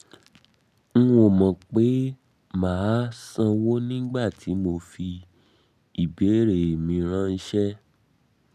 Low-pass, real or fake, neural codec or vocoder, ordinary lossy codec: 14.4 kHz; real; none; none